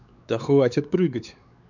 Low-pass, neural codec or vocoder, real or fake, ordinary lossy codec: 7.2 kHz; codec, 16 kHz, 4 kbps, X-Codec, WavLM features, trained on Multilingual LibriSpeech; fake; none